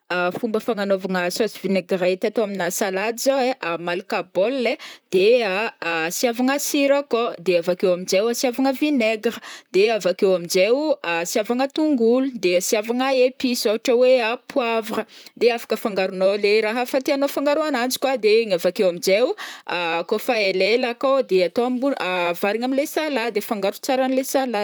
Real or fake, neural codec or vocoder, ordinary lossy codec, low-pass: fake; vocoder, 44.1 kHz, 128 mel bands, Pupu-Vocoder; none; none